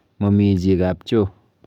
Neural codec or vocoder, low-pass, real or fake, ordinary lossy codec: codec, 44.1 kHz, 7.8 kbps, DAC; 19.8 kHz; fake; none